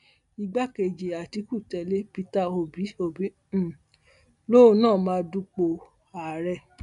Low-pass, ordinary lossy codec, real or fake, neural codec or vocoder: 10.8 kHz; none; real; none